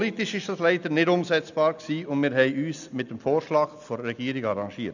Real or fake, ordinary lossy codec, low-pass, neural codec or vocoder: real; none; 7.2 kHz; none